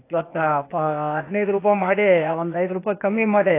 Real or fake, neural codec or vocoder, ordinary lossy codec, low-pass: fake; codec, 16 kHz, 0.8 kbps, ZipCodec; AAC, 24 kbps; 3.6 kHz